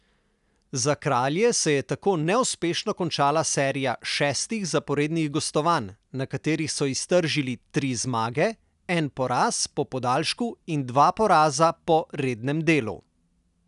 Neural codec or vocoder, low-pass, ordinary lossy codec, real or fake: none; 10.8 kHz; none; real